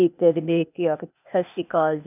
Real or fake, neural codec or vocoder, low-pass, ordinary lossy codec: fake; codec, 16 kHz, 0.8 kbps, ZipCodec; 3.6 kHz; none